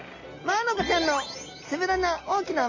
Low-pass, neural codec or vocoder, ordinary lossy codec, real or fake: 7.2 kHz; none; MP3, 32 kbps; real